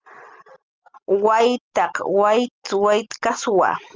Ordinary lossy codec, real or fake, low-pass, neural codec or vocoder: Opus, 24 kbps; real; 7.2 kHz; none